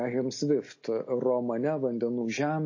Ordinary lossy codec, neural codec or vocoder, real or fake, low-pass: MP3, 32 kbps; none; real; 7.2 kHz